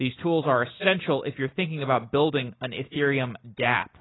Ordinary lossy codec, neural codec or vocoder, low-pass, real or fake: AAC, 16 kbps; none; 7.2 kHz; real